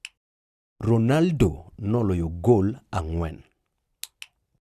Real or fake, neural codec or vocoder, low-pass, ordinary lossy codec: real; none; 14.4 kHz; Opus, 64 kbps